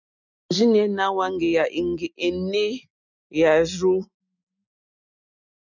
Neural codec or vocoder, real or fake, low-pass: none; real; 7.2 kHz